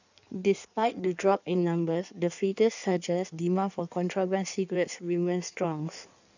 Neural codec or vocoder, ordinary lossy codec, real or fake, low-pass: codec, 16 kHz in and 24 kHz out, 1.1 kbps, FireRedTTS-2 codec; none; fake; 7.2 kHz